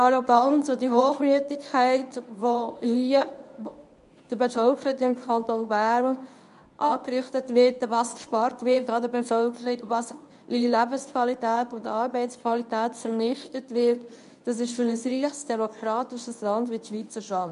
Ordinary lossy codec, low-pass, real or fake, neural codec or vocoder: none; 10.8 kHz; fake; codec, 24 kHz, 0.9 kbps, WavTokenizer, medium speech release version 1